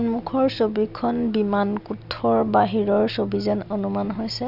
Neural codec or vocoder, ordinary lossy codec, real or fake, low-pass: none; none; real; 5.4 kHz